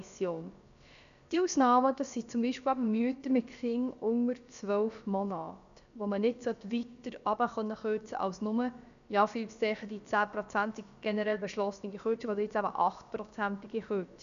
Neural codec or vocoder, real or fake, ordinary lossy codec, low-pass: codec, 16 kHz, about 1 kbps, DyCAST, with the encoder's durations; fake; none; 7.2 kHz